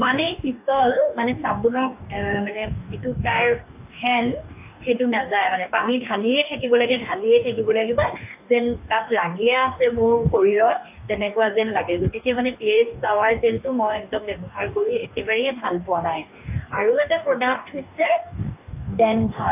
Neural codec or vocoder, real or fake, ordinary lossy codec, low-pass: codec, 44.1 kHz, 2.6 kbps, DAC; fake; AAC, 32 kbps; 3.6 kHz